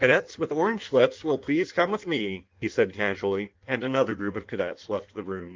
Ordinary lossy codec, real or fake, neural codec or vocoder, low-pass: Opus, 32 kbps; fake; codec, 16 kHz in and 24 kHz out, 1.1 kbps, FireRedTTS-2 codec; 7.2 kHz